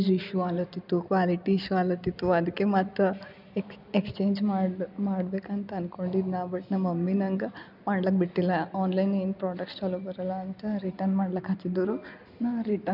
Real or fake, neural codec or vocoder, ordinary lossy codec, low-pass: real; none; none; 5.4 kHz